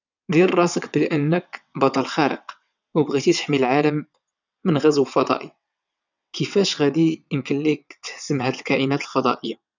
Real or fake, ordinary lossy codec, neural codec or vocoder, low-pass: fake; none; vocoder, 22.05 kHz, 80 mel bands, WaveNeXt; 7.2 kHz